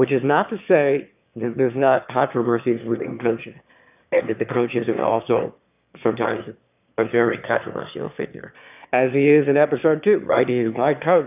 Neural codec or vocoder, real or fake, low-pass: autoencoder, 22.05 kHz, a latent of 192 numbers a frame, VITS, trained on one speaker; fake; 3.6 kHz